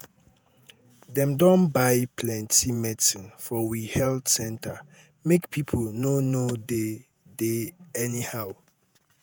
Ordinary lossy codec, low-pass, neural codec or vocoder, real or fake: none; none; vocoder, 48 kHz, 128 mel bands, Vocos; fake